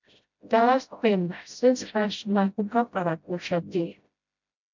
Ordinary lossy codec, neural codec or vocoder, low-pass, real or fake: MP3, 64 kbps; codec, 16 kHz, 0.5 kbps, FreqCodec, smaller model; 7.2 kHz; fake